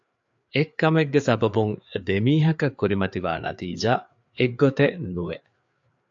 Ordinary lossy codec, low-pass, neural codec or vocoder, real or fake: AAC, 64 kbps; 7.2 kHz; codec, 16 kHz, 4 kbps, FreqCodec, larger model; fake